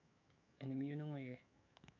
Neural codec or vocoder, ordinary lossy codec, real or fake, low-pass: autoencoder, 48 kHz, 128 numbers a frame, DAC-VAE, trained on Japanese speech; none; fake; 7.2 kHz